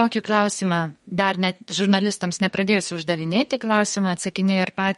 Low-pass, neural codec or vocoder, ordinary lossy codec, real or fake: 14.4 kHz; codec, 32 kHz, 1.9 kbps, SNAC; MP3, 48 kbps; fake